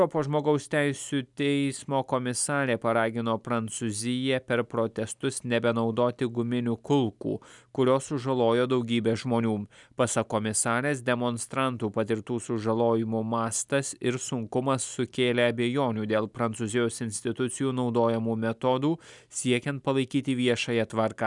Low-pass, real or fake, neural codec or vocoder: 10.8 kHz; real; none